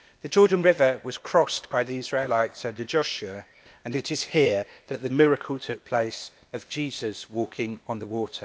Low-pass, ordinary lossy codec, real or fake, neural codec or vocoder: none; none; fake; codec, 16 kHz, 0.8 kbps, ZipCodec